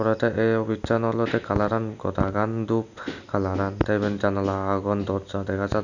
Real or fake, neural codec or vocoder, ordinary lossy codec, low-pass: real; none; none; 7.2 kHz